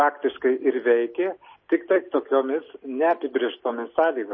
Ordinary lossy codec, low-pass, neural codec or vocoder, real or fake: MP3, 24 kbps; 7.2 kHz; none; real